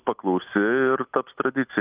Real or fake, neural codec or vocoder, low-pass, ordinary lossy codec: real; none; 3.6 kHz; Opus, 24 kbps